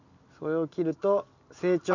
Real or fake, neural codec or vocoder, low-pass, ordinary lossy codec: real; none; 7.2 kHz; none